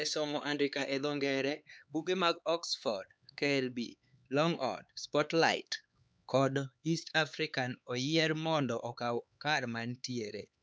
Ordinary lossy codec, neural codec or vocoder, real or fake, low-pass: none; codec, 16 kHz, 4 kbps, X-Codec, HuBERT features, trained on LibriSpeech; fake; none